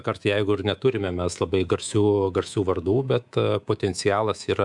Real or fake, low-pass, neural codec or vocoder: fake; 10.8 kHz; codec, 24 kHz, 3.1 kbps, DualCodec